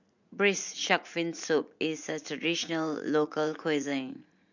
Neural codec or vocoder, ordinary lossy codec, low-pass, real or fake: vocoder, 44.1 kHz, 80 mel bands, Vocos; none; 7.2 kHz; fake